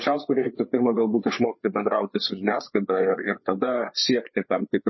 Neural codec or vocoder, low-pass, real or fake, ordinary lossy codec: codec, 16 kHz, 4 kbps, FreqCodec, larger model; 7.2 kHz; fake; MP3, 24 kbps